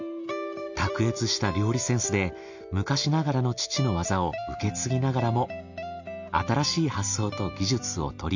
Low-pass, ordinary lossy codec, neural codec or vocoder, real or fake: 7.2 kHz; none; none; real